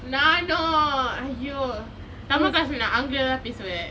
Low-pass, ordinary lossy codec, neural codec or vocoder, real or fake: none; none; none; real